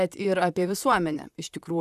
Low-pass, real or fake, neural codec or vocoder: 14.4 kHz; fake; vocoder, 44.1 kHz, 128 mel bands, Pupu-Vocoder